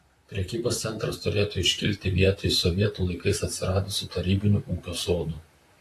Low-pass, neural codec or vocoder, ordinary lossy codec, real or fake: 14.4 kHz; vocoder, 44.1 kHz, 128 mel bands, Pupu-Vocoder; AAC, 48 kbps; fake